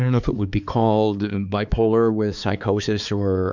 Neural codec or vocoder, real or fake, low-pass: codec, 16 kHz, 4 kbps, X-Codec, HuBERT features, trained on balanced general audio; fake; 7.2 kHz